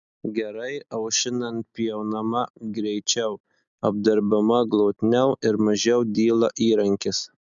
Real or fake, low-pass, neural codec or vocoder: real; 7.2 kHz; none